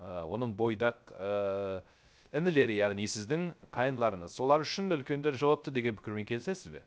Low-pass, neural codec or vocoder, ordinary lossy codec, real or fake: none; codec, 16 kHz, 0.3 kbps, FocalCodec; none; fake